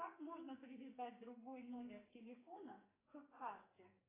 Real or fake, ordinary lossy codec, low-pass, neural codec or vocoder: fake; AAC, 16 kbps; 3.6 kHz; codec, 44.1 kHz, 2.6 kbps, SNAC